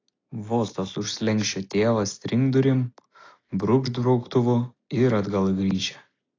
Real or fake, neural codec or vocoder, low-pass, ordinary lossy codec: real; none; 7.2 kHz; AAC, 32 kbps